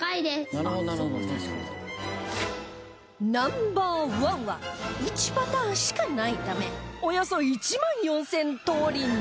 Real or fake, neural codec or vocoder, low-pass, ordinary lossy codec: real; none; none; none